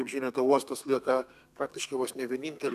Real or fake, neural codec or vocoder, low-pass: fake; codec, 32 kHz, 1.9 kbps, SNAC; 14.4 kHz